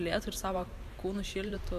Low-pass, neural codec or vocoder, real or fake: 14.4 kHz; vocoder, 44.1 kHz, 128 mel bands every 512 samples, BigVGAN v2; fake